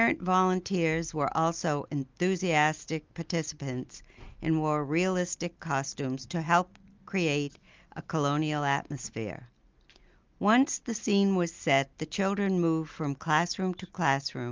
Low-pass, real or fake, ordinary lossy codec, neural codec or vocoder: 7.2 kHz; real; Opus, 32 kbps; none